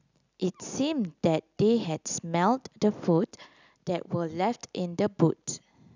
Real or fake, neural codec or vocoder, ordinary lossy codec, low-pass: real; none; none; 7.2 kHz